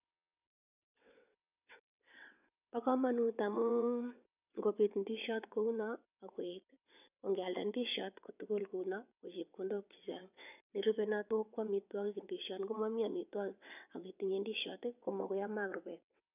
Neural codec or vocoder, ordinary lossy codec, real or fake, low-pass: vocoder, 22.05 kHz, 80 mel bands, WaveNeXt; none; fake; 3.6 kHz